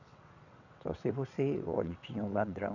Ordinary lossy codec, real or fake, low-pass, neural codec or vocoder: AAC, 48 kbps; fake; 7.2 kHz; vocoder, 22.05 kHz, 80 mel bands, WaveNeXt